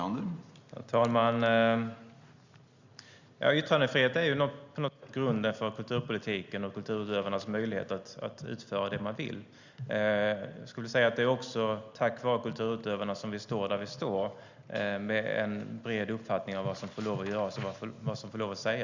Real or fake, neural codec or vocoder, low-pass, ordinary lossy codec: real; none; 7.2 kHz; Opus, 64 kbps